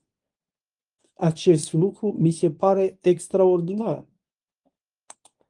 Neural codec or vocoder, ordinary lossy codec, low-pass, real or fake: codec, 24 kHz, 0.9 kbps, WavTokenizer, medium speech release version 1; Opus, 32 kbps; 10.8 kHz; fake